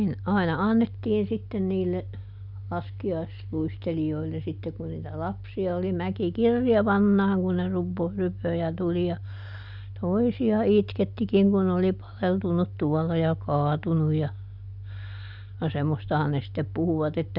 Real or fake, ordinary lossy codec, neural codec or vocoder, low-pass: real; none; none; 5.4 kHz